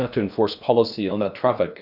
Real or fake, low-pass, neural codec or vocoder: fake; 5.4 kHz; codec, 16 kHz in and 24 kHz out, 0.6 kbps, FocalCodec, streaming, 4096 codes